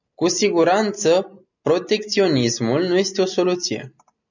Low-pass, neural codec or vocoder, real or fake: 7.2 kHz; none; real